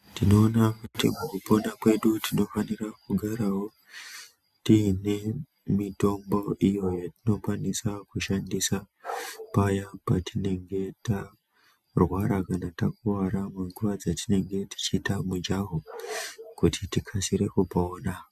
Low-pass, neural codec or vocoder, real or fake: 14.4 kHz; none; real